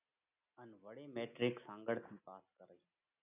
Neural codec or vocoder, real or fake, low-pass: none; real; 3.6 kHz